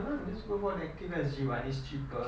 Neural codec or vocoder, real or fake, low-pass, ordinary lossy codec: none; real; none; none